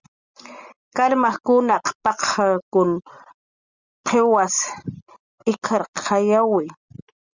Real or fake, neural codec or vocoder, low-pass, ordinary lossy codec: real; none; 7.2 kHz; Opus, 64 kbps